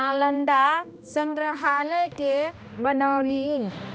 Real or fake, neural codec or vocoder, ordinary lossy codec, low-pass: fake; codec, 16 kHz, 1 kbps, X-Codec, HuBERT features, trained on balanced general audio; none; none